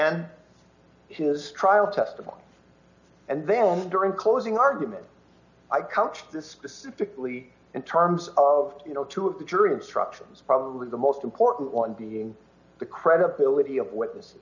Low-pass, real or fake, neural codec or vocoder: 7.2 kHz; real; none